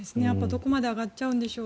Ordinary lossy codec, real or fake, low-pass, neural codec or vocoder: none; real; none; none